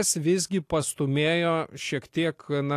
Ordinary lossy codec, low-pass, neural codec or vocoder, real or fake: AAC, 64 kbps; 14.4 kHz; none; real